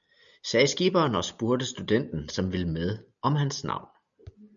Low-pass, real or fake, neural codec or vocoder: 7.2 kHz; real; none